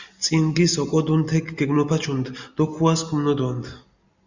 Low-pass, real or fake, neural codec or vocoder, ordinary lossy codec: 7.2 kHz; real; none; Opus, 64 kbps